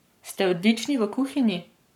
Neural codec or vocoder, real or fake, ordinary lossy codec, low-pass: codec, 44.1 kHz, 7.8 kbps, Pupu-Codec; fake; none; 19.8 kHz